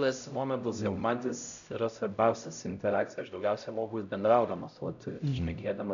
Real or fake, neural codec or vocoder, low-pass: fake; codec, 16 kHz, 0.5 kbps, X-Codec, HuBERT features, trained on LibriSpeech; 7.2 kHz